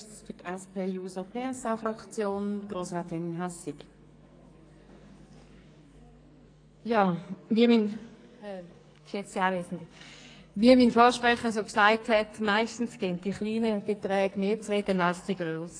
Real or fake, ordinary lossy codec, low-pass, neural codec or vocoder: fake; AAC, 48 kbps; 9.9 kHz; codec, 44.1 kHz, 2.6 kbps, SNAC